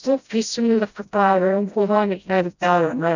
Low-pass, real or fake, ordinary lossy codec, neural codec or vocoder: 7.2 kHz; fake; none; codec, 16 kHz, 0.5 kbps, FreqCodec, smaller model